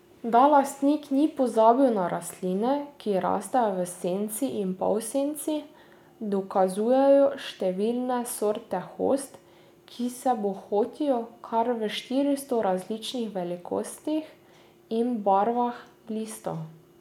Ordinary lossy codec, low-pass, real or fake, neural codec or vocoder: none; 19.8 kHz; real; none